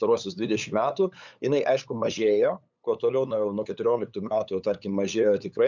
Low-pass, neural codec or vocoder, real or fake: 7.2 kHz; codec, 16 kHz, 8 kbps, FunCodec, trained on LibriTTS, 25 frames a second; fake